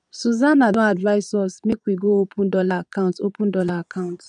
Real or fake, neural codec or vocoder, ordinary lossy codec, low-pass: fake; vocoder, 22.05 kHz, 80 mel bands, Vocos; none; 9.9 kHz